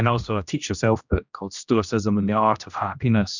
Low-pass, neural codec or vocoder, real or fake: 7.2 kHz; codec, 16 kHz, 1 kbps, X-Codec, HuBERT features, trained on general audio; fake